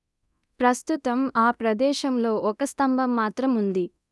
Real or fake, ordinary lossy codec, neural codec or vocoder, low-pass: fake; none; codec, 24 kHz, 0.9 kbps, DualCodec; none